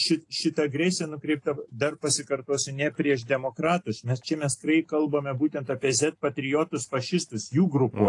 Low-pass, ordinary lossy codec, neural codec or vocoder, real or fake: 10.8 kHz; AAC, 48 kbps; none; real